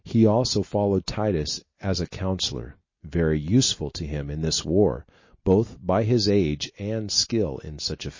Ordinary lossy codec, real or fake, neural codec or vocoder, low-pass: MP3, 32 kbps; real; none; 7.2 kHz